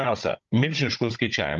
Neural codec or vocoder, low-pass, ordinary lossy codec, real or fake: codec, 16 kHz, 16 kbps, FunCodec, trained on Chinese and English, 50 frames a second; 7.2 kHz; Opus, 32 kbps; fake